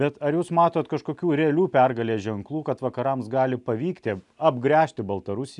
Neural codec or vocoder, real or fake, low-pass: none; real; 10.8 kHz